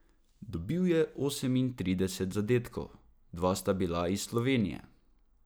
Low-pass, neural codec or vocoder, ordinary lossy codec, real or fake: none; none; none; real